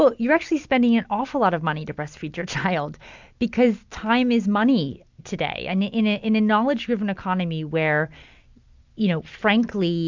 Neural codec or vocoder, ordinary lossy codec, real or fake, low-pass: none; MP3, 64 kbps; real; 7.2 kHz